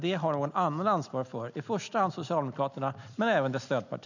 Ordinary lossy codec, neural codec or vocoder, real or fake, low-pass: none; none; real; 7.2 kHz